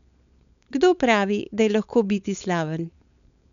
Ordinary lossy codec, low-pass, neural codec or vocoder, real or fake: none; 7.2 kHz; codec, 16 kHz, 4.8 kbps, FACodec; fake